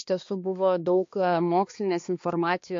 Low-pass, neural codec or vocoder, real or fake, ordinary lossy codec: 7.2 kHz; codec, 16 kHz, 2 kbps, X-Codec, HuBERT features, trained on balanced general audio; fake; AAC, 48 kbps